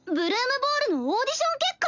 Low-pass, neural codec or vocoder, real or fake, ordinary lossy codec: 7.2 kHz; none; real; none